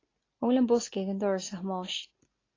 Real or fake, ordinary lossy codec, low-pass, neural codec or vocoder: real; AAC, 32 kbps; 7.2 kHz; none